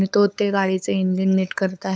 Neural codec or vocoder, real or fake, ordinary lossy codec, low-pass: codec, 16 kHz, 8 kbps, FunCodec, trained on LibriTTS, 25 frames a second; fake; none; none